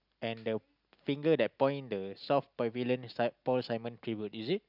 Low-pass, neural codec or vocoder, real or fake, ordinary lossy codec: 5.4 kHz; none; real; none